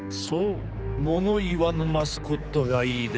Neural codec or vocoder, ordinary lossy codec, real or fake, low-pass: codec, 16 kHz, 4 kbps, X-Codec, HuBERT features, trained on general audio; none; fake; none